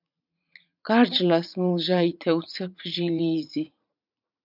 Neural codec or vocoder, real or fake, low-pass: vocoder, 44.1 kHz, 80 mel bands, Vocos; fake; 5.4 kHz